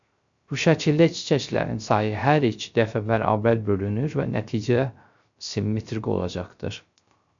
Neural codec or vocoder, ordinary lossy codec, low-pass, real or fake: codec, 16 kHz, 0.3 kbps, FocalCodec; MP3, 64 kbps; 7.2 kHz; fake